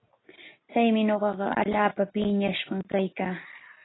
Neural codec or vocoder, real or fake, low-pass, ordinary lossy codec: none; real; 7.2 kHz; AAC, 16 kbps